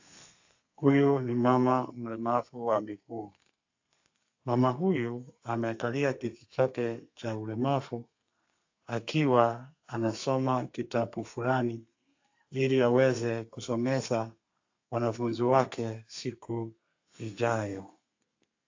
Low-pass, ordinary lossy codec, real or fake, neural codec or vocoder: 7.2 kHz; AAC, 48 kbps; fake; codec, 32 kHz, 1.9 kbps, SNAC